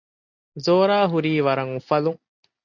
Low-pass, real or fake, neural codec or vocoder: 7.2 kHz; real; none